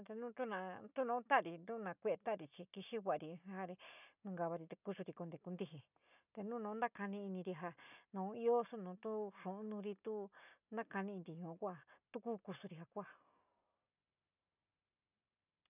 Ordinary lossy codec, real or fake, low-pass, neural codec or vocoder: none; real; 3.6 kHz; none